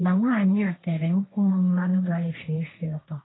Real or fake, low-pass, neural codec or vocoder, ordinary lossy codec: fake; 7.2 kHz; codec, 16 kHz, 1.1 kbps, Voila-Tokenizer; AAC, 16 kbps